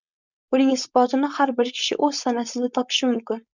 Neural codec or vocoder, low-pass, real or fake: codec, 16 kHz, 4.8 kbps, FACodec; 7.2 kHz; fake